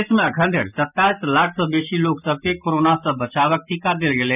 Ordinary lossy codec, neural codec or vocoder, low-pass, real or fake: none; none; 3.6 kHz; real